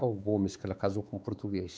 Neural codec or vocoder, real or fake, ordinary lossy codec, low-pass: codec, 16 kHz, 4 kbps, X-Codec, WavLM features, trained on Multilingual LibriSpeech; fake; none; none